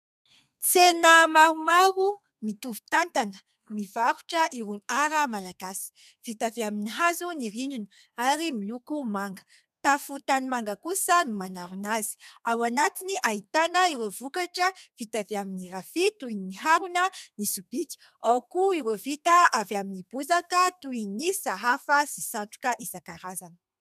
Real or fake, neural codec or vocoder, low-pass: fake; codec, 32 kHz, 1.9 kbps, SNAC; 14.4 kHz